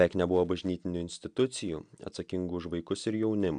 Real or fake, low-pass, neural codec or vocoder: real; 9.9 kHz; none